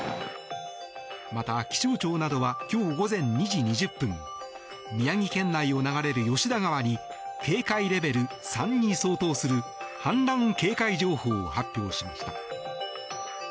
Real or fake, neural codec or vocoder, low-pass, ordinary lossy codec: real; none; none; none